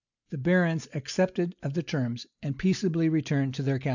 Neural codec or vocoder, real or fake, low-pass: none; real; 7.2 kHz